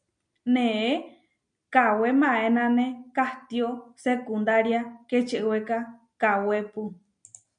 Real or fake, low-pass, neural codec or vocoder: real; 9.9 kHz; none